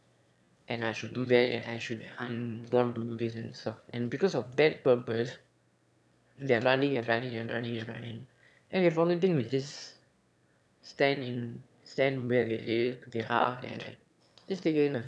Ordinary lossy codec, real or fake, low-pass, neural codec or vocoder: none; fake; none; autoencoder, 22.05 kHz, a latent of 192 numbers a frame, VITS, trained on one speaker